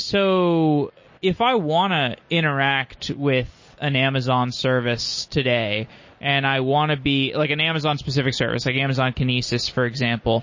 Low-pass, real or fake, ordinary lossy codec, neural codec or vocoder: 7.2 kHz; real; MP3, 32 kbps; none